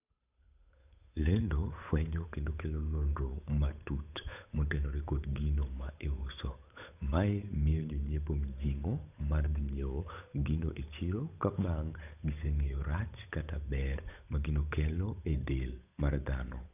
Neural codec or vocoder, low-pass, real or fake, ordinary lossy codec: codec, 16 kHz, 8 kbps, FunCodec, trained on Chinese and English, 25 frames a second; 3.6 kHz; fake; none